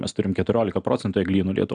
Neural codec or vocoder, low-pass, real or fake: none; 9.9 kHz; real